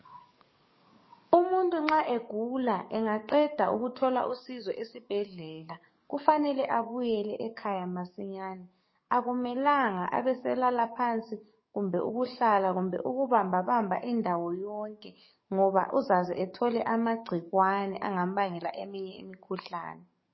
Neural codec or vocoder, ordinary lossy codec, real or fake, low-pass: codec, 44.1 kHz, 7.8 kbps, DAC; MP3, 24 kbps; fake; 7.2 kHz